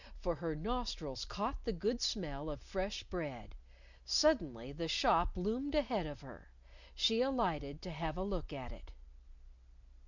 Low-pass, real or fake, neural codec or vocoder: 7.2 kHz; real; none